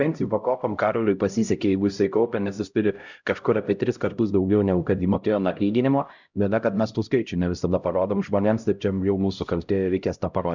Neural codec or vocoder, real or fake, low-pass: codec, 16 kHz, 0.5 kbps, X-Codec, HuBERT features, trained on LibriSpeech; fake; 7.2 kHz